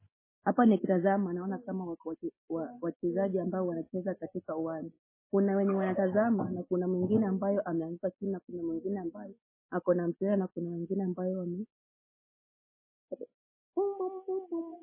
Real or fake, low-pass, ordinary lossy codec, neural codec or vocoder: real; 3.6 kHz; MP3, 16 kbps; none